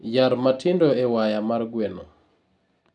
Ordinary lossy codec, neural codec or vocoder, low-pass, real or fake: none; none; 10.8 kHz; real